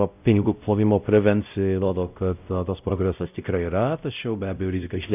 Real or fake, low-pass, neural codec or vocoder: fake; 3.6 kHz; codec, 16 kHz, 0.5 kbps, X-Codec, WavLM features, trained on Multilingual LibriSpeech